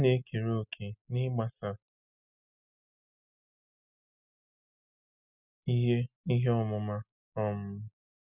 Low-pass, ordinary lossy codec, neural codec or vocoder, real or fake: 3.6 kHz; none; none; real